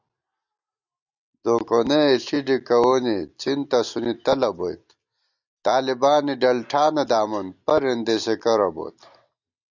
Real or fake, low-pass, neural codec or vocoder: real; 7.2 kHz; none